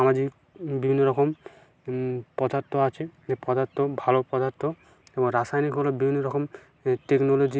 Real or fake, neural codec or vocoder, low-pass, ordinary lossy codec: real; none; none; none